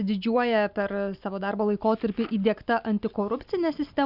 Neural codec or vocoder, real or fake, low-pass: none; real; 5.4 kHz